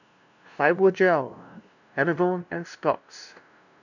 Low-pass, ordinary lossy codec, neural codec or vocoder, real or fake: 7.2 kHz; none; codec, 16 kHz, 0.5 kbps, FunCodec, trained on LibriTTS, 25 frames a second; fake